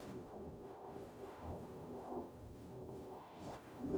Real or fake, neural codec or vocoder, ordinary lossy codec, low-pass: fake; codec, 44.1 kHz, 0.9 kbps, DAC; none; none